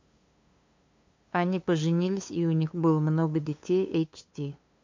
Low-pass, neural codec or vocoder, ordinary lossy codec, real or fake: 7.2 kHz; codec, 16 kHz, 2 kbps, FunCodec, trained on LibriTTS, 25 frames a second; MP3, 48 kbps; fake